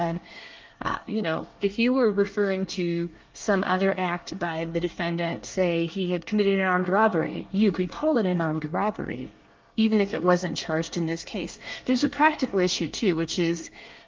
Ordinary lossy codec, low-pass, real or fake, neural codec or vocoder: Opus, 32 kbps; 7.2 kHz; fake; codec, 24 kHz, 1 kbps, SNAC